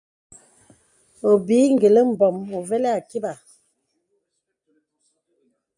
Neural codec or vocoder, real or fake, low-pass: none; real; 10.8 kHz